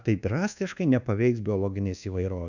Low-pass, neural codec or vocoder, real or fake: 7.2 kHz; codec, 24 kHz, 1.2 kbps, DualCodec; fake